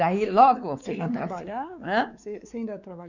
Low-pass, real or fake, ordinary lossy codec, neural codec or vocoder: 7.2 kHz; fake; none; codec, 16 kHz, 4 kbps, X-Codec, WavLM features, trained on Multilingual LibriSpeech